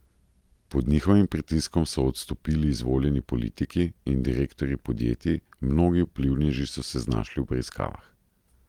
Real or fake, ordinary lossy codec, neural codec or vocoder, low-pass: real; Opus, 24 kbps; none; 19.8 kHz